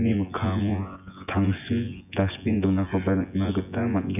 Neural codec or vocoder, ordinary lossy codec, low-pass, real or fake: vocoder, 24 kHz, 100 mel bands, Vocos; none; 3.6 kHz; fake